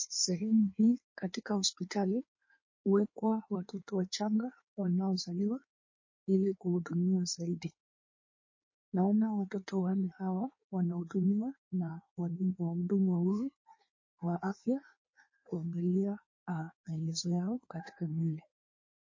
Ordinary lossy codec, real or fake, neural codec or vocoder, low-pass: MP3, 32 kbps; fake; codec, 16 kHz in and 24 kHz out, 1.1 kbps, FireRedTTS-2 codec; 7.2 kHz